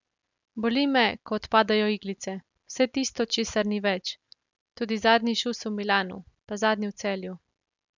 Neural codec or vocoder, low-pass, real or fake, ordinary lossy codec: none; 7.2 kHz; real; none